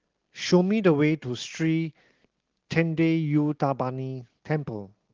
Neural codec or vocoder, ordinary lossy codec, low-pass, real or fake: none; Opus, 16 kbps; 7.2 kHz; real